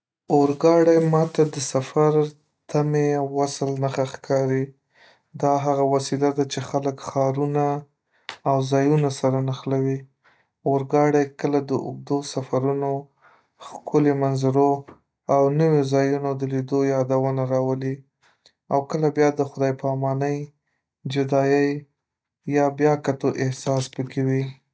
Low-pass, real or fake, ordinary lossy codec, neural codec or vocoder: none; real; none; none